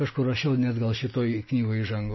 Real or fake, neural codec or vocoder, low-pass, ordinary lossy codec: real; none; 7.2 kHz; MP3, 24 kbps